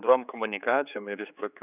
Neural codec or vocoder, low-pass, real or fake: codec, 16 kHz, 4 kbps, X-Codec, HuBERT features, trained on general audio; 3.6 kHz; fake